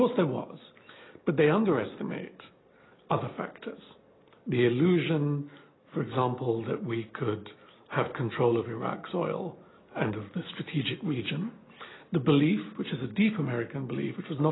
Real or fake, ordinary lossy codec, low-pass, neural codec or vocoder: real; AAC, 16 kbps; 7.2 kHz; none